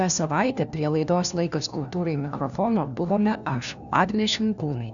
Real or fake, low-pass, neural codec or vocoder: fake; 7.2 kHz; codec, 16 kHz, 1 kbps, FunCodec, trained on LibriTTS, 50 frames a second